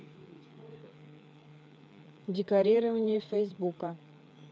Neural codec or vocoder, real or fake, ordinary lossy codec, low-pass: codec, 16 kHz, 4 kbps, FreqCodec, larger model; fake; none; none